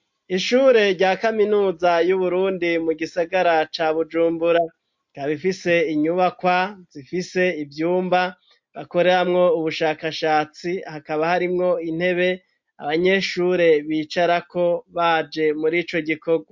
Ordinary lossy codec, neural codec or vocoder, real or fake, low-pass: MP3, 48 kbps; none; real; 7.2 kHz